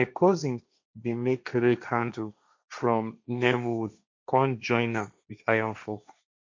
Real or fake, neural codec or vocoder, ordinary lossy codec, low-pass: fake; codec, 16 kHz, 1.1 kbps, Voila-Tokenizer; MP3, 64 kbps; 7.2 kHz